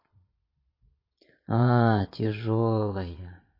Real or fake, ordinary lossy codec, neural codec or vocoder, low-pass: fake; MP3, 24 kbps; vocoder, 22.05 kHz, 80 mel bands, Vocos; 5.4 kHz